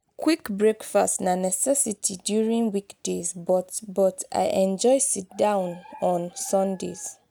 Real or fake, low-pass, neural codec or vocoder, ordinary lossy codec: real; none; none; none